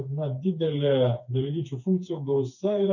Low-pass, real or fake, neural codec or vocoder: 7.2 kHz; fake; codec, 16 kHz, 4 kbps, FreqCodec, smaller model